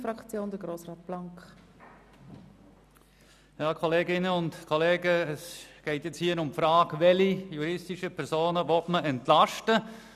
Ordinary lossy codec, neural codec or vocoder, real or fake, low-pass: none; none; real; 14.4 kHz